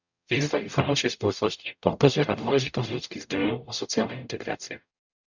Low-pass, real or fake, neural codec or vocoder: 7.2 kHz; fake; codec, 44.1 kHz, 0.9 kbps, DAC